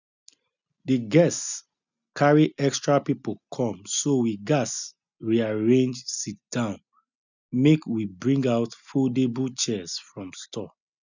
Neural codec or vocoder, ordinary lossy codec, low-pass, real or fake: none; none; 7.2 kHz; real